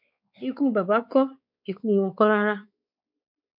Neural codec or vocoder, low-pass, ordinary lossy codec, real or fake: codec, 16 kHz, 4 kbps, X-Codec, WavLM features, trained on Multilingual LibriSpeech; 5.4 kHz; MP3, 48 kbps; fake